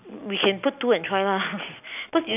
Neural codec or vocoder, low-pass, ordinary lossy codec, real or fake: none; 3.6 kHz; none; real